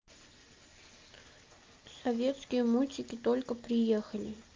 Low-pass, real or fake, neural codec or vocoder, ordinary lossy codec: 7.2 kHz; real; none; Opus, 24 kbps